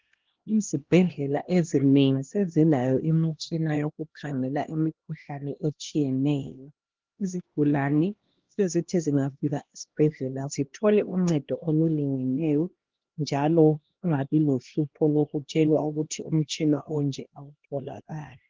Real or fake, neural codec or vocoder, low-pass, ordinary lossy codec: fake; codec, 16 kHz, 1 kbps, X-Codec, HuBERT features, trained on LibriSpeech; 7.2 kHz; Opus, 16 kbps